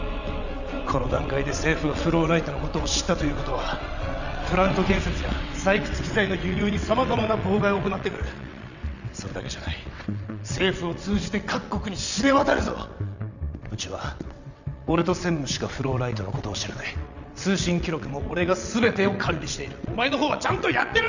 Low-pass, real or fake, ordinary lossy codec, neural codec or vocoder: 7.2 kHz; fake; none; vocoder, 22.05 kHz, 80 mel bands, WaveNeXt